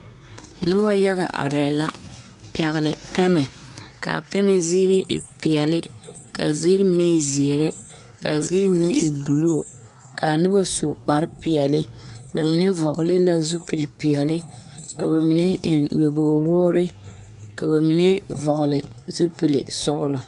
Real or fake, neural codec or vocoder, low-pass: fake; codec, 24 kHz, 1 kbps, SNAC; 10.8 kHz